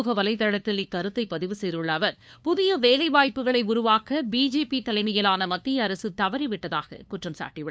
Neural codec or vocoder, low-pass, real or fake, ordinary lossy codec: codec, 16 kHz, 2 kbps, FunCodec, trained on LibriTTS, 25 frames a second; none; fake; none